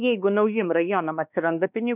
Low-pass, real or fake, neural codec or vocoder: 3.6 kHz; fake; codec, 16 kHz, 2 kbps, X-Codec, WavLM features, trained on Multilingual LibriSpeech